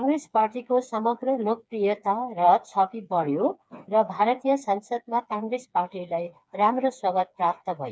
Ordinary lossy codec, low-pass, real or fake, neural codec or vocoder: none; none; fake; codec, 16 kHz, 4 kbps, FreqCodec, smaller model